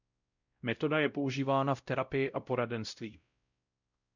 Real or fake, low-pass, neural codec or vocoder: fake; 7.2 kHz; codec, 16 kHz, 0.5 kbps, X-Codec, WavLM features, trained on Multilingual LibriSpeech